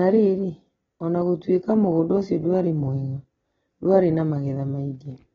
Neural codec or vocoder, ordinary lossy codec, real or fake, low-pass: none; AAC, 24 kbps; real; 7.2 kHz